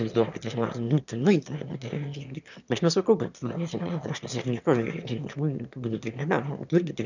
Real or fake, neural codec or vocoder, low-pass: fake; autoencoder, 22.05 kHz, a latent of 192 numbers a frame, VITS, trained on one speaker; 7.2 kHz